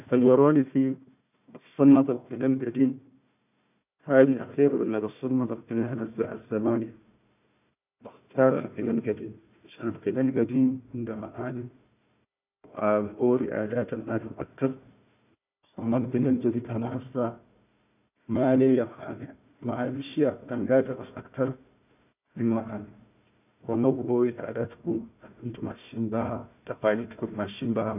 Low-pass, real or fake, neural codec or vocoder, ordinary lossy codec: 3.6 kHz; fake; codec, 16 kHz, 1 kbps, FunCodec, trained on Chinese and English, 50 frames a second; none